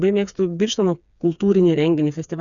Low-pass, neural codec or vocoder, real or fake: 7.2 kHz; codec, 16 kHz, 4 kbps, FreqCodec, smaller model; fake